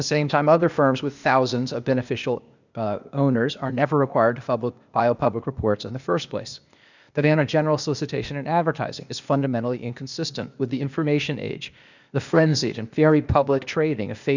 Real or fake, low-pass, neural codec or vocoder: fake; 7.2 kHz; codec, 16 kHz, 0.8 kbps, ZipCodec